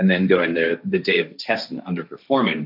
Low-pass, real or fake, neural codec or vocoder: 5.4 kHz; fake; codec, 16 kHz, 1.1 kbps, Voila-Tokenizer